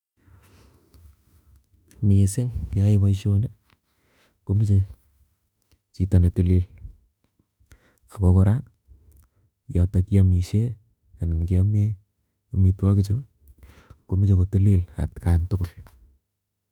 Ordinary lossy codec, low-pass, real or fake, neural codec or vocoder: none; 19.8 kHz; fake; autoencoder, 48 kHz, 32 numbers a frame, DAC-VAE, trained on Japanese speech